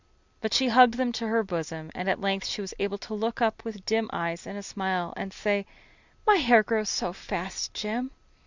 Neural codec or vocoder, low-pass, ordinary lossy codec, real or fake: none; 7.2 kHz; Opus, 64 kbps; real